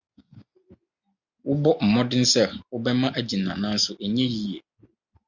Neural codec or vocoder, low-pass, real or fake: none; 7.2 kHz; real